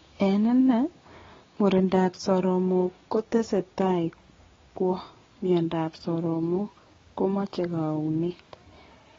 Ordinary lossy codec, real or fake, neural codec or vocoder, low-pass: AAC, 24 kbps; fake; codec, 16 kHz, 6 kbps, DAC; 7.2 kHz